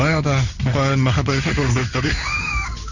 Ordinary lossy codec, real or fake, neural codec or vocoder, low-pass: none; fake; codec, 16 kHz in and 24 kHz out, 1 kbps, XY-Tokenizer; 7.2 kHz